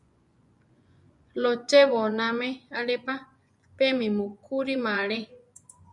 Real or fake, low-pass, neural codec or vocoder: fake; 10.8 kHz; vocoder, 24 kHz, 100 mel bands, Vocos